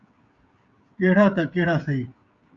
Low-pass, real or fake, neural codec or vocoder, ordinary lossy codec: 7.2 kHz; fake; codec, 16 kHz, 16 kbps, FreqCodec, smaller model; Opus, 64 kbps